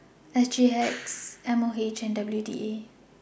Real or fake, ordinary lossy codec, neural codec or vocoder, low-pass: real; none; none; none